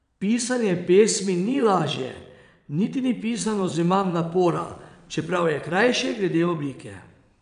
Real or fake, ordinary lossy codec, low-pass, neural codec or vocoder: fake; none; 9.9 kHz; vocoder, 22.05 kHz, 80 mel bands, Vocos